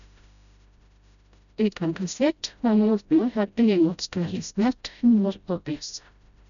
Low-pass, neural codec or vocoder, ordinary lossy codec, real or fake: 7.2 kHz; codec, 16 kHz, 0.5 kbps, FreqCodec, smaller model; none; fake